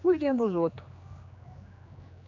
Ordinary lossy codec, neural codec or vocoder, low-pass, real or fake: MP3, 64 kbps; codec, 16 kHz, 2 kbps, X-Codec, HuBERT features, trained on general audio; 7.2 kHz; fake